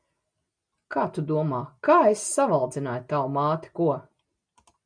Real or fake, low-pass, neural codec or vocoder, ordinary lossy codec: real; 9.9 kHz; none; MP3, 96 kbps